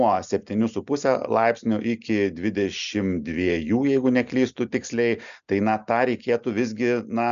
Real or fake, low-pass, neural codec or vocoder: real; 7.2 kHz; none